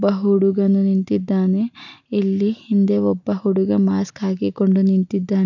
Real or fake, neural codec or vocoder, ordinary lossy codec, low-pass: real; none; none; 7.2 kHz